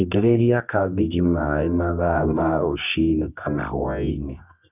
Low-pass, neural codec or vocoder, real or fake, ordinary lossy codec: 3.6 kHz; codec, 24 kHz, 0.9 kbps, WavTokenizer, medium music audio release; fake; none